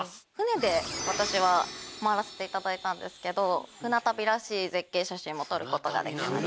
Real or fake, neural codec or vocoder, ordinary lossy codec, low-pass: real; none; none; none